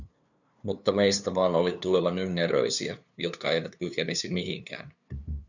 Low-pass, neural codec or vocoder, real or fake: 7.2 kHz; codec, 16 kHz, 2 kbps, FunCodec, trained on LibriTTS, 25 frames a second; fake